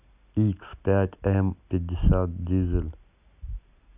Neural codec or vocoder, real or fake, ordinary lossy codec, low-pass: none; real; AAC, 32 kbps; 3.6 kHz